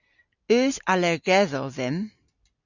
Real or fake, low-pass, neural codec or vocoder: real; 7.2 kHz; none